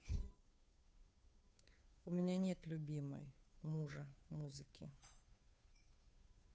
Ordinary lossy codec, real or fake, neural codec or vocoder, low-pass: none; fake; codec, 16 kHz, 2 kbps, FunCodec, trained on Chinese and English, 25 frames a second; none